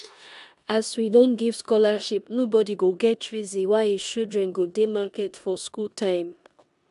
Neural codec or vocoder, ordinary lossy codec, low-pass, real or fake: codec, 16 kHz in and 24 kHz out, 0.9 kbps, LongCat-Audio-Codec, four codebook decoder; AAC, 96 kbps; 10.8 kHz; fake